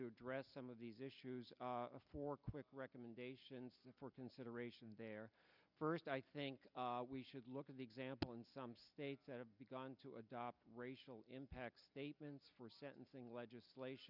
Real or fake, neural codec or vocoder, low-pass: real; none; 5.4 kHz